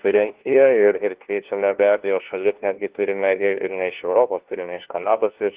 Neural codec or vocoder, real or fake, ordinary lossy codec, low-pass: codec, 16 kHz, 1 kbps, FunCodec, trained on LibriTTS, 50 frames a second; fake; Opus, 16 kbps; 3.6 kHz